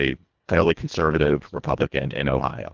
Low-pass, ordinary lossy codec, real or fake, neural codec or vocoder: 7.2 kHz; Opus, 24 kbps; fake; codec, 24 kHz, 1.5 kbps, HILCodec